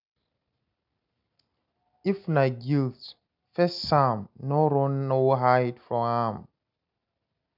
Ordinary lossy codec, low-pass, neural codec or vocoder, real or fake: none; 5.4 kHz; none; real